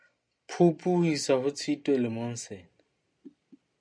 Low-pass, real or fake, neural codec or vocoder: 9.9 kHz; real; none